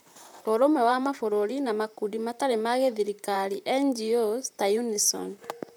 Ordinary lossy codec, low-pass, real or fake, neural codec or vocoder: none; none; fake; vocoder, 44.1 kHz, 128 mel bands, Pupu-Vocoder